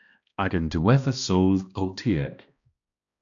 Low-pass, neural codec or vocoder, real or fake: 7.2 kHz; codec, 16 kHz, 1 kbps, X-Codec, HuBERT features, trained on balanced general audio; fake